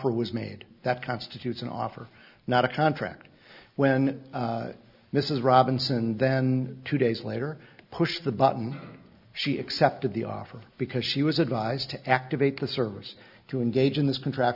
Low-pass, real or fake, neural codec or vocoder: 5.4 kHz; real; none